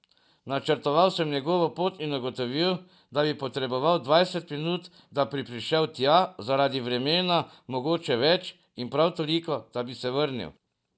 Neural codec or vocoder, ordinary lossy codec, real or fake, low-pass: none; none; real; none